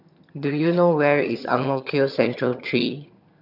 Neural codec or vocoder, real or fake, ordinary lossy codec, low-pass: vocoder, 22.05 kHz, 80 mel bands, HiFi-GAN; fake; none; 5.4 kHz